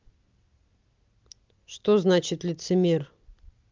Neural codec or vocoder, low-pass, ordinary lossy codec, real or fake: none; 7.2 kHz; Opus, 32 kbps; real